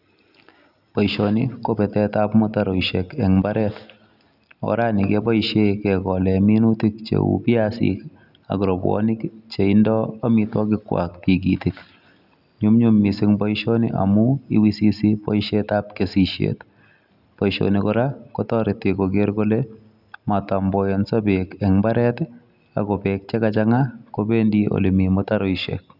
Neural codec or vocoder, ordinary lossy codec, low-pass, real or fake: none; none; 5.4 kHz; real